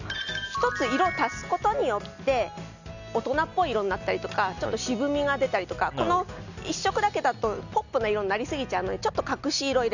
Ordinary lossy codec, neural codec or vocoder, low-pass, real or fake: none; none; 7.2 kHz; real